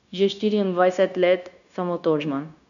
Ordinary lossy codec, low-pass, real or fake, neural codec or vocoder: none; 7.2 kHz; fake; codec, 16 kHz, 0.9 kbps, LongCat-Audio-Codec